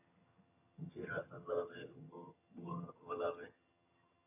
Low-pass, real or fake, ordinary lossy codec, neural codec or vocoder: 3.6 kHz; fake; AAC, 24 kbps; vocoder, 22.05 kHz, 80 mel bands, HiFi-GAN